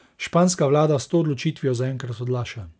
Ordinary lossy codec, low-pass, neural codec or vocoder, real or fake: none; none; none; real